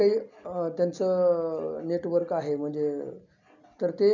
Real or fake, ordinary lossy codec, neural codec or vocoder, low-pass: real; none; none; 7.2 kHz